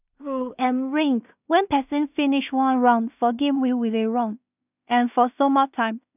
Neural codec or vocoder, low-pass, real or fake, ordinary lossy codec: codec, 16 kHz in and 24 kHz out, 0.4 kbps, LongCat-Audio-Codec, two codebook decoder; 3.6 kHz; fake; none